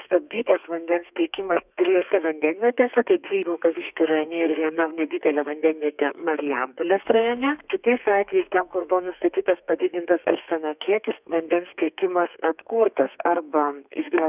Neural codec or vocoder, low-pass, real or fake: codec, 32 kHz, 1.9 kbps, SNAC; 3.6 kHz; fake